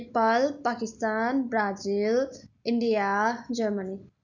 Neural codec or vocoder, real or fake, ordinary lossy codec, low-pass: none; real; none; 7.2 kHz